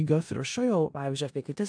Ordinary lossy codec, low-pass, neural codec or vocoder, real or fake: AAC, 64 kbps; 9.9 kHz; codec, 16 kHz in and 24 kHz out, 0.4 kbps, LongCat-Audio-Codec, four codebook decoder; fake